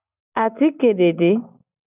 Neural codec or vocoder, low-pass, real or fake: none; 3.6 kHz; real